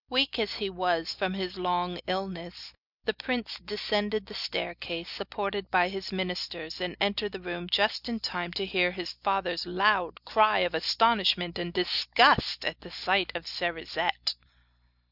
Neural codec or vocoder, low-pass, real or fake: none; 5.4 kHz; real